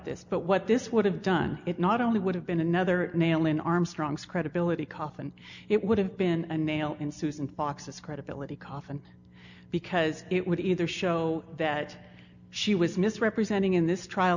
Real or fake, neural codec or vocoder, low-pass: real; none; 7.2 kHz